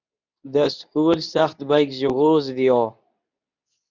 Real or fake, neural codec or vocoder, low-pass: fake; codec, 24 kHz, 0.9 kbps, WavTokenizer, medium speech release version 2; 7.2 kHz